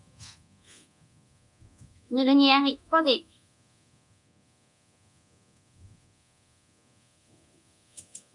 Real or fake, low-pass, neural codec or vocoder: fake; 10.8 kHz; codec, 24 kHz, 0.9 kbps, DualCodec